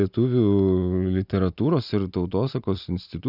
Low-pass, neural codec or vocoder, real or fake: 5.4 kHz; none; real